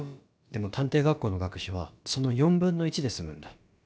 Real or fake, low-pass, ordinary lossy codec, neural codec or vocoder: fake; none; none; codec, 16 kHz, about 1 kbps, DyCAST, with the encoder's durations